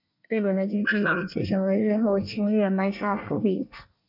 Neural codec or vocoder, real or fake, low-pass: codec, 24 kHz, 1 kbps, SNAC; fake; 5.4 kHz